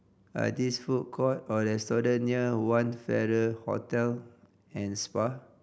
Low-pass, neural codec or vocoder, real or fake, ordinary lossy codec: none; none; real; none